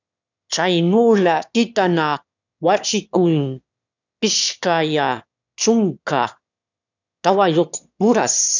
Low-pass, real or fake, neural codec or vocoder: 7.2 kHz; fake; autoencoder, 22.05 kHz, a latent of 192 numbers a frame, VITS, trained on one speaker